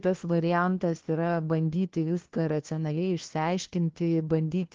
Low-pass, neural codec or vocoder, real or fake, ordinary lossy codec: 7.2 kHz; codec, 16 kHz, 1 kbps, FunCodec, trained on LibriTTS, 50 frames a second; fake; Opus, 16 kbps